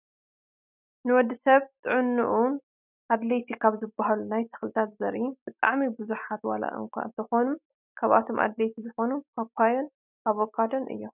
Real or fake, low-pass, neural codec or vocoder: real; 3.6 kHz; none